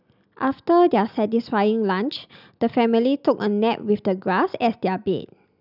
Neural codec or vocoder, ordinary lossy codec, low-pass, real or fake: none; none; 5.4 kHz; real